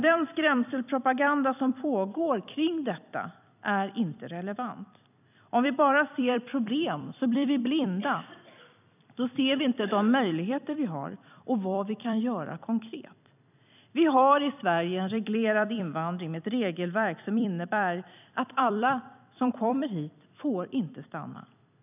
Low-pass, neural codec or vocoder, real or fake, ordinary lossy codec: 3.6 kHz; vocoder, 44.1 kHz, 128 mel bands every 256 samples, BigVGAN v2; fake; none